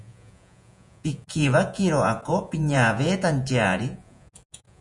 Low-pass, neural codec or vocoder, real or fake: 10.8 kHz; vocoder, 48 kHz, 128 mel bands, Vocos; fake